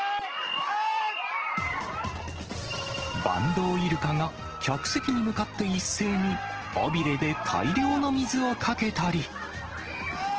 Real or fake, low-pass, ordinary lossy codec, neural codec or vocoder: real; 7.2 kHz; Opus, 16 kbps; none